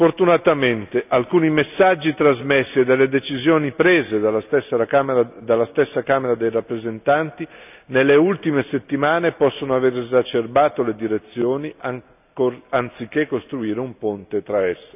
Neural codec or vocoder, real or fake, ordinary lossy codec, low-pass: none; real; none; 3.6 kHz